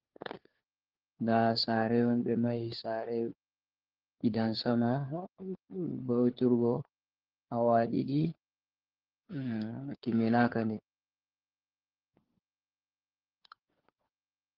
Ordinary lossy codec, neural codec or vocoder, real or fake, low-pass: Opus, 16 kbps; codec, 16 kHz, 4 kbps, FunCodec, trained on LibriTTS, 50 frames a second; fake; 5.4 kHz